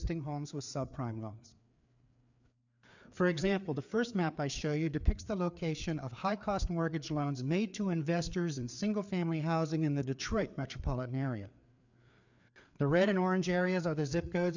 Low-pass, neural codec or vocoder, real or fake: 7.2 kHz; codec, 16 kHz, 4 kbps, FreqCodec, larger model; fake